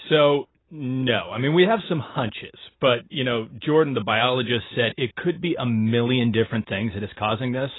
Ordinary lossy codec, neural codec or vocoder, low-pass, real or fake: AAC, 16 kbps; none; 7.2 kHz; real